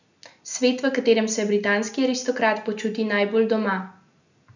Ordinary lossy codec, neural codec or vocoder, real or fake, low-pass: none; none; real; 7.2 kHz